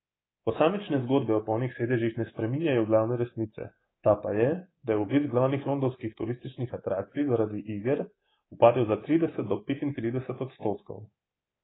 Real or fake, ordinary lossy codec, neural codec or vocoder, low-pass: fake; AAC, 16 kbps; codec, 24 kHz, 3.1 kbps, DualCodec; 7.2 kHz